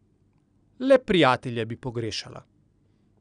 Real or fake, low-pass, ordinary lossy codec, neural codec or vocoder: real; 9.9 kHz; none; none